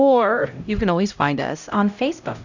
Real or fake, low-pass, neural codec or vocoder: fake; 7.2 kHz; codec, 16 kHz, 0.5 kbps, X-Codec, HuBERT features, trained on LibriSpeech